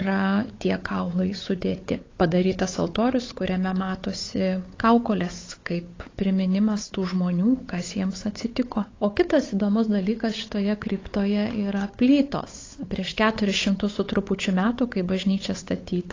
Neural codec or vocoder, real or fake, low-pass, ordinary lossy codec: codec, 16 kHz, 8 kbps, FunCodec, trained on Chinese and English, 25 frames a second; fake; 7.2 kHz; AAC, 32 kbps